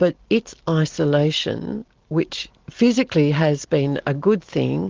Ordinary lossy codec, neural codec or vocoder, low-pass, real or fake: Opus, 16 kbps; none; 7.2 kHz; real